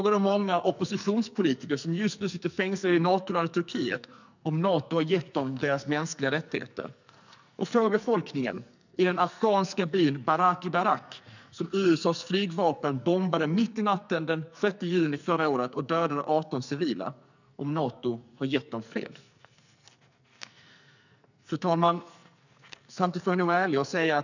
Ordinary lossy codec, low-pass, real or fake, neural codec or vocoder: none; 7.2 kHz; fake; codec, 44.1 kHz, 2.6 kbps, SNAC